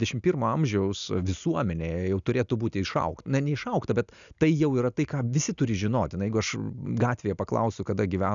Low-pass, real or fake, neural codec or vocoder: 7.2 kHz; real; none